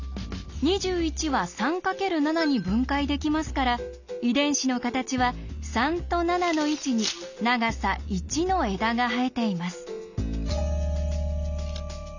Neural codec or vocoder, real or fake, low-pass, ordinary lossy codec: none; real; 7.2 kHz; none